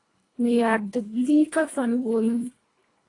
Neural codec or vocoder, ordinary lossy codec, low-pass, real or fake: codec, 24 kHz, 1.5 kbps, HILCodec; AAC, 32 kbps; 10.8 kHz; fake